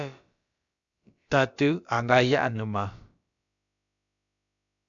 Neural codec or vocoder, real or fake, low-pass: codec, 16 kHz, about 1 kbps, DyCAST, with the encoder's durations; fake; 7.2 kHz